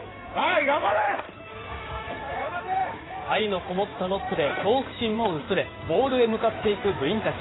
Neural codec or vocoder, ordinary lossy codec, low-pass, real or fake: codec, 16 kHz in and 24 kHz out, 2.2 kbps, FireRedTTS-2 codec; AAC, 16 kbps; 7.2 kHz; fake